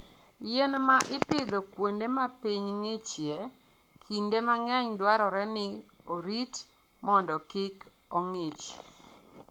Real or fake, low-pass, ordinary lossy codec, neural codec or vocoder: fake; 19.8 kHz; none; codec, 44.1 kHz, 7.8 kbps, Pupu-Codec